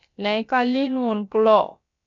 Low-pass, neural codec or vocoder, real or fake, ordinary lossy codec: 7.2 kHz; codec, 16 kHz, about 1 kbps, DyCAST, with the encoder's durations; fake; MP3, 64 kbps